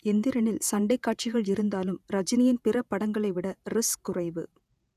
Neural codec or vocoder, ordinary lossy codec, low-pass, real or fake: vocoder, 48 kHz, 128 mel bands, Vocos; none; 14.4 kHz; fake